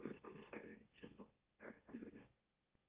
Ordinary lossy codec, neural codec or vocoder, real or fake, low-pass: Opus, 24 kbps; autoencoder, 44.1 kHz, a latent of 192 numbers a frame, MeloTTS; fake; 3.6 kHz